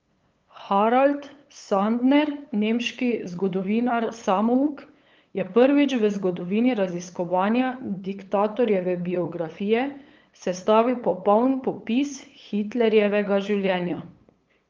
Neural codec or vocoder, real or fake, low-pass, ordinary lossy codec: codec, 16 kHz, 8 kbps, FunCodec, trained on LibriTTS, 25 frames a second; fake; 7.2 kHz; Opus, 24 kbps